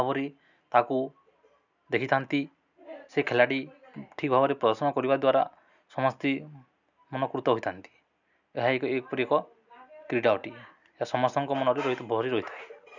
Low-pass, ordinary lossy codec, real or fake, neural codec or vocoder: 7.2 kHz; none; real; none